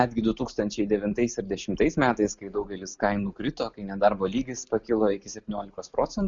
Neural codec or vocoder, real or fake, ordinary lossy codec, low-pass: none; real; Opus, 64 kbps; 7.2 kHz